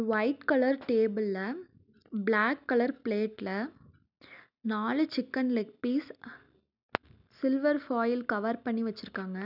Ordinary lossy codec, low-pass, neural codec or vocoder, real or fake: none; 5.4 kHz; none; real